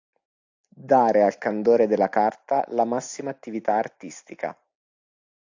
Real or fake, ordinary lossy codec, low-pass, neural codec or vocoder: real; AAC, 48 kbps; 7.2 kHz; none